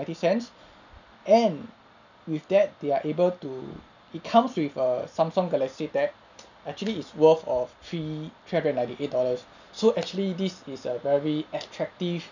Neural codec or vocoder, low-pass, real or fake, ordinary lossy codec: none; 7.2 kHz; real; none